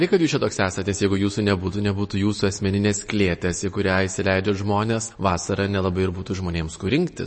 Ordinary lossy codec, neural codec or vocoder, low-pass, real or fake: MP3, 32 kbps; none; 9.9 kHz; real